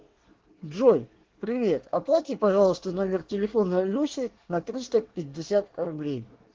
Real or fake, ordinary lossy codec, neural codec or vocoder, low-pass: fake; Opus, 16 kbps; codec, 24 kHz, 1 kbps, SNAC; 7.2 kHz